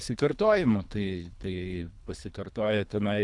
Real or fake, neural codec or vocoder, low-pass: fake; codec, 24 kHz, 3 kbps, HILCodec; 10.8 kHz